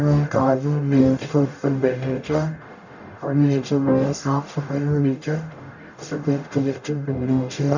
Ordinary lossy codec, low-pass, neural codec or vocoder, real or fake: none; 7.2 kHz; codec, 44.1 kHz, 0.9 kbps, DAC; fake